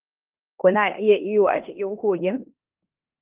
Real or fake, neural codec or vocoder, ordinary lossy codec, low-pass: fake; codec, 16 kHz in and 24 kHz out, 0.9 kbps, LongCat-Audio-Codec, four codebook decoder; Opus, 32 kbps; 3.6 kHz